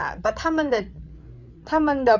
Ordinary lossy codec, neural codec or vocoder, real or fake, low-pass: none; codec, 16 kHz, 8 kbps, FreqCodec, larger model; fake; 7.2 kHz